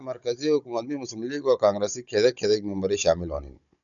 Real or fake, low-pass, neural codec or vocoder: fake; 7.2 kHz; codec, 16 kHz, 16 kbps, FunCodec, trained on Chinese and English, 50 frames a second